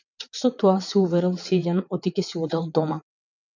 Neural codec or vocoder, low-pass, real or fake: vocoder, 22.05 kHz, 80 mel bands, WaveNeXt; 7.2 kHz; fake